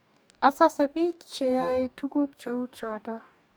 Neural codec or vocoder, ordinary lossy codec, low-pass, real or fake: codec, 44.1 kHz, 2.6 kbps, DAC; none; none; fake